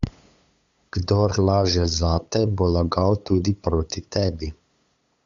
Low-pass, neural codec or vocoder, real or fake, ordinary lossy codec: 7.2 kHz; codec, 16 kHz, 8 kbps, FunCodec, trained on LibriTTS, 25 frames a second; fake; Opus, 64 kbps